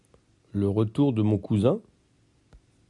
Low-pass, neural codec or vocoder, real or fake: 10.8 kHz; none; real